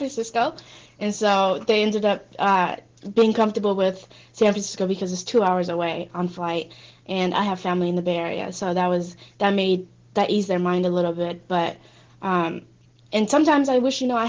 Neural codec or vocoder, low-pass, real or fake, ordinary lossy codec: none; 7.2 kHz; real; Opus, 16 kbps